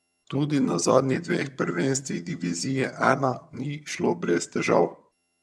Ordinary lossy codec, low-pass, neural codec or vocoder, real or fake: none; none; vocoder, 22.05 kHz, 80 mel bands, HiFi-GAN; fake